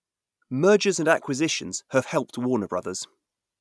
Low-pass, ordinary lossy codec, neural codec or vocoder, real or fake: none; none; none; real